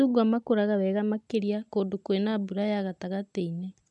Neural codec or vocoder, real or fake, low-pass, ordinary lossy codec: none; real; 10.8 kHz; none